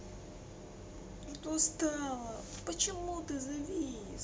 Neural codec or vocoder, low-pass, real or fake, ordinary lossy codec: none; none; real; none